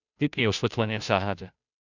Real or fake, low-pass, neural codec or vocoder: fake; 7.2 kHz; codec, 16 kHz, 0.5 kbps, FunCodec, trained on Chinese and English, 25 frames a second